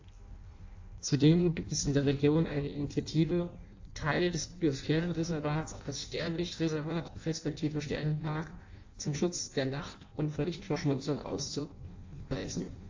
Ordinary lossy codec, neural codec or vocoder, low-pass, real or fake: AAC, 48 kbps; codec, 16 kHz in and 24 kHz out, 0.6 kbps, FireRedTTS-2 codec; 7.2 kHz; fake